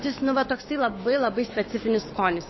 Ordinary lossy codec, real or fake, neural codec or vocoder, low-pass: MP3, 24 kbps; fake; codec, 16 kHz, 6 kbps, DAC; 7.2 kHz